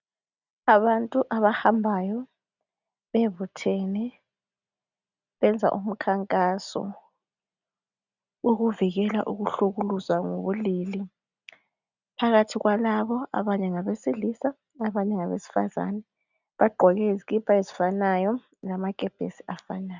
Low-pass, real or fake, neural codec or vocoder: 7.2 kHz; real; none